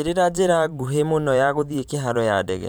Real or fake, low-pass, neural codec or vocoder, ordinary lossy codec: fake; none; vocoder, 44.1 kHz, 128 mel bands every 512 samples, BigVGAN v2; none